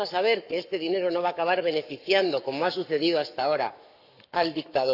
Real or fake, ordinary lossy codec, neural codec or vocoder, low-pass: fake; none; codec, 44.1 kHz, 7.8 kbps, Pupu-Codec; 5.4 kHz